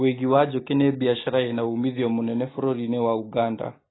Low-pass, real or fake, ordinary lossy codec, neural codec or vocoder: 7.2 kHz; real; AAC, 16 kbps; none